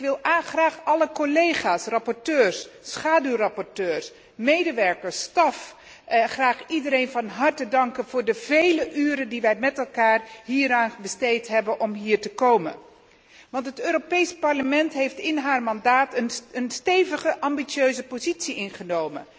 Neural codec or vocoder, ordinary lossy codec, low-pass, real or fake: none; none; none; real